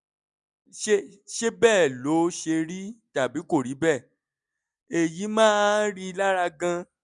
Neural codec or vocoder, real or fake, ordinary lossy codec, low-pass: none; real; none; 10.8 kHz